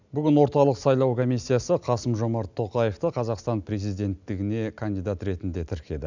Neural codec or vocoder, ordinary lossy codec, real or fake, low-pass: none; none; real; 7.2 kHz